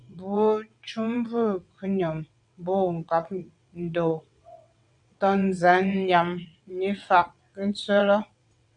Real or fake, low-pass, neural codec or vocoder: fake; 9.9 kHz; vocoder, 22.05 kHz, 80 mel bands, WaveNeXt